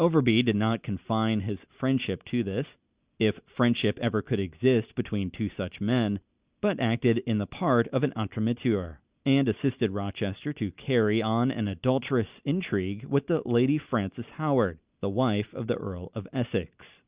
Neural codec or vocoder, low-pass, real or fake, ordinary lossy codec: none; 3.6 kHz; real; Opus, 64 kbps